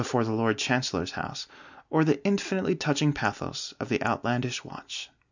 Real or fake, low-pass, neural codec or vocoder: real; 7.2 kHz; none